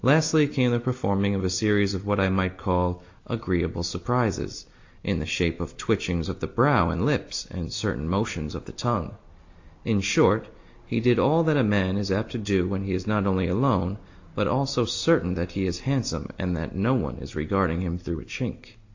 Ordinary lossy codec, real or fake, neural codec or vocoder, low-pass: AAC, 48 kbps; real; none; 7.2 kHz